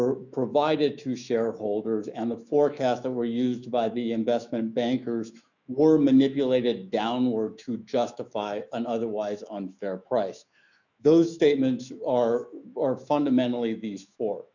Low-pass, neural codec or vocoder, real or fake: 7.2 kHz; autoencoder, 48 kHz, 128 numbers a frame, DAC-VAE, trained on Japanese speech; fake